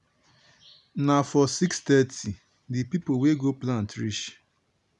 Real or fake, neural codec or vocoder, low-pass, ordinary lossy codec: real; none; 10.8 kHz; none